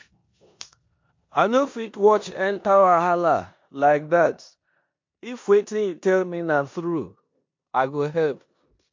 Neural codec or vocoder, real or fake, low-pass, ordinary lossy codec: codec, 16 kHz in and 24 kHz out, 0.9 kbps, LongCat-Audio-Codec, four codebook decoder; fake; 7.2 kHz; MP3, 48 kbps